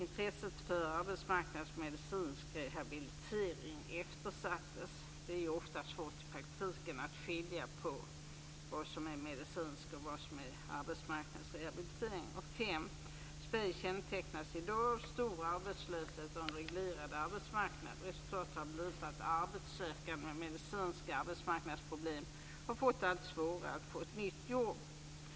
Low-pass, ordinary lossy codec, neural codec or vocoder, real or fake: none; none; none; real